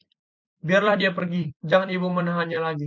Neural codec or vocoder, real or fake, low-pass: vocoder, 44.1 kHz, 128 mel bands every 256 samples, BigVGAN v2; fake; 7.2 kHz